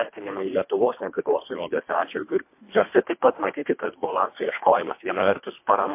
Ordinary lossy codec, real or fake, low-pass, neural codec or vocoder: MP3, 24 kbps; fake; 3.6 kHz; codec, 24 kHz, 1.5 kbps, HILCodec